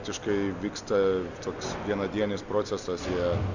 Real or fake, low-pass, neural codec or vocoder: real; 7.2 kHz; none